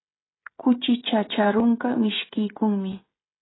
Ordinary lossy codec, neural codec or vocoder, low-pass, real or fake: AAC, 16 kbps; codec, 16 kHz, 16 kbps, FreqCodec, smaller model; 7.2 kHz; fake